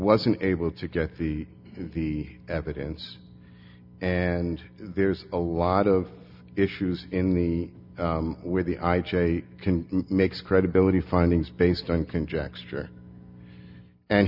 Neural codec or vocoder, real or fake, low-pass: none; real; 5.4 kHz